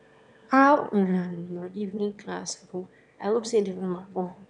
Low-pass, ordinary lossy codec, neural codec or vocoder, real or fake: 9.9 kHz; none; autoencoder, 22.05 kHz, a latent of 192 numbers a frame, VITS, trained on one speaker; fake